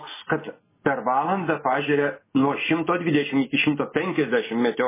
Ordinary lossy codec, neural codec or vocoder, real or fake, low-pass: MP3, 16 kbps; none; real; 3.6 kHz